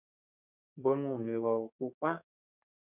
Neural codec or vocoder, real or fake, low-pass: codec, 32 kHz, 1.9 kbps, SNAC; fake; 3.6 kHz